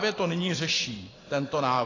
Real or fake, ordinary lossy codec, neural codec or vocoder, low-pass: fake; AAC, 32 kbps; vocoder, 44.1 kHz, 128 mel bands every 512 samples, BigVGAN v2; 7.2 kHz